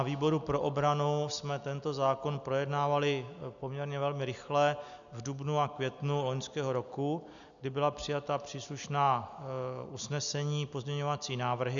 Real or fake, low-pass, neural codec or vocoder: real; 7.2 kHz; none